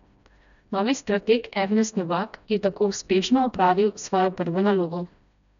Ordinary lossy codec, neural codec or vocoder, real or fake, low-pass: none; codec, 16 kHz, 1 kbps, FreqCodec, smaller model; fake; 7.2 kHz